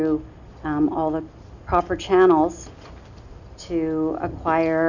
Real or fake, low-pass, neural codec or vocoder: real; 7.2 kHz; none